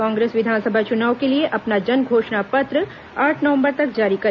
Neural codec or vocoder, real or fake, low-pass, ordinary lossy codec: none; real; 7.2 kHz; none